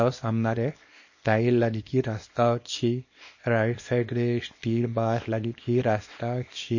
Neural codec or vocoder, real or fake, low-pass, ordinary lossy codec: codec, 24 kHz, 0.9 kbps, WavTokenizer, small release; fake; 7.2 kHz; MP3, 32 kbps